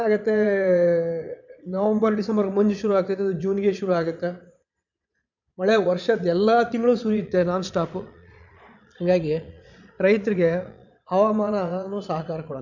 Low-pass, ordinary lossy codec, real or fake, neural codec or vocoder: 7.2 kHz; none; fake; vocoder, 22.05 kHz, 80 mel bands, Vocos